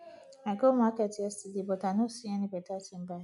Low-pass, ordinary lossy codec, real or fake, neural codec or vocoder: 10.8 kHz; none; real; none